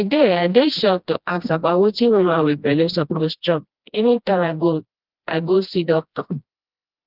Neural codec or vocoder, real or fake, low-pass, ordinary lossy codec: codec, 16 kHz, 1 kbps, FreqCodec, smaller model; fake; 5.4 kHz; Opus, 32 kbps